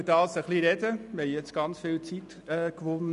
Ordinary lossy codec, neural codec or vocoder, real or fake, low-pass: none; none; real; 10.8 kHz